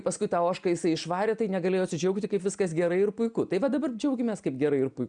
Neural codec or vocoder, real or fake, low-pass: none; real; 9.9 kHz